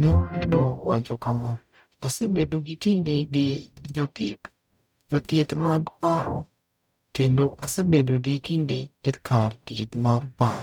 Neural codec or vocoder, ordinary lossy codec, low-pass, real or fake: codec, 44.1 kHz, 0.9 kbps, DAC; none; 19.8 kHz; fake